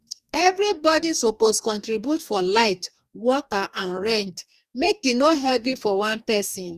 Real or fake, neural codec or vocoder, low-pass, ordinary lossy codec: fake; codec, 44.1 kHz, 2.6 kbps, DAC; 14.4 kHz; Opus, 64 kbps